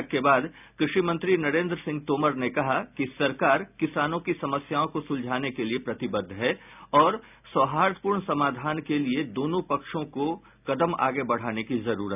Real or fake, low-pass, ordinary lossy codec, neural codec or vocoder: real; 3.6 kHz; none; none